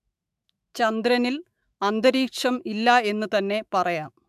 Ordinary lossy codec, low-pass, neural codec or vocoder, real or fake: AAC, 96 kbps; 14.4 kHz; autoencoder, 48 kHz, 128 numbers a frame, DAC-VAE, trained on Japanese speech; fake